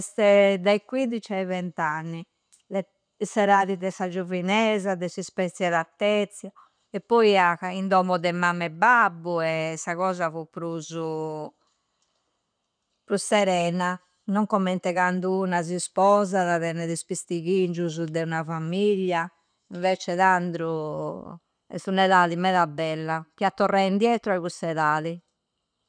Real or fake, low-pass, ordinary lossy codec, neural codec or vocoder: real; 9.9 kHz; none; none